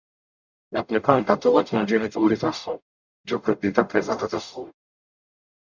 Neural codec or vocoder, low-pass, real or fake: codec, 44.1 kHz, 0.9 kbps, DAC; 7.2 kHz; fake